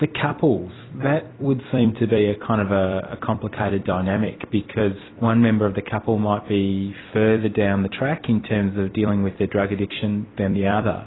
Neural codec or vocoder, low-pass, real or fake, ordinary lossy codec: vocoder, 44.1 kHz, 128 mel bands every 256 samples, BigVGAN v2; 7.2 kHz; fake; AAC, 16 kbps